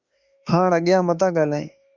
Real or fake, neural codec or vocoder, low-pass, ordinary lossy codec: fake; autoencoder, 48 kHz, 32 numbers a frame, DAC-VAE, trained on Japanese speech; 7.2 kHz; Opus, 64 kbps